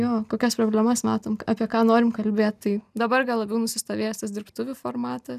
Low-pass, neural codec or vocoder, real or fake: 14.4 kHz; none; real